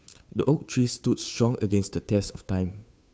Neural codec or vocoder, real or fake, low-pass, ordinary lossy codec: codec, 16 kHz, 2 kbps, FunCodec, trained on Chinese and English, 25 frames a second; fake; none; none